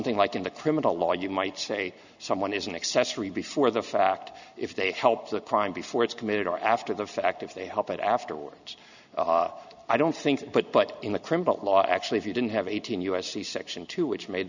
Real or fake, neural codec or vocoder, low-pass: real; none; 7.2 kHz